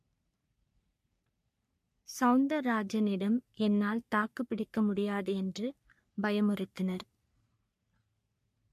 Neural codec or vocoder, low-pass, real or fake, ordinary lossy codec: codec, 44.1 kHz, 3.4 kbps, Pupu-Codec; 14.4 kHz; fake; MP3, 64 kbps